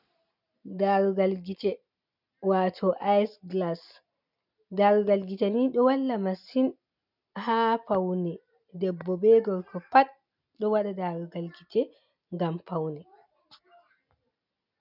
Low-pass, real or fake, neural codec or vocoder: 5.4 kHz; real; none